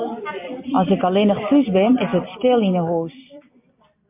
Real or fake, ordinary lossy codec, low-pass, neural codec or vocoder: fake; MP3, 32 kbps; 3.6 kHz; vocoder, 44.1 kHz, 128 mel bands every 256 samples, BigVGAN v2